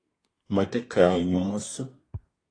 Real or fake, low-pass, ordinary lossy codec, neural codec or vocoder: fake; 9.9 kHz; AAC, 32 kbps; codec, 24 kHz, 1 kbps, SNAC